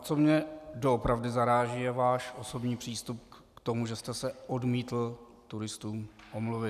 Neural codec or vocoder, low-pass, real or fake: none; 14.4 kHz; real